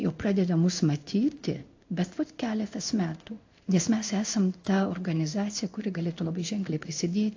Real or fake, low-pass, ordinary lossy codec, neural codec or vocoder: fake; 7.2 kHz; AAC, 48 kbps; codec, 16 kHz in and 24 kHz out, 1 kbps, XY-Tokenizer